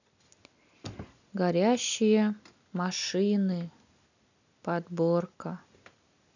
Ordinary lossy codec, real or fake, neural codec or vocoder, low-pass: none; real; none; 7.2 kHz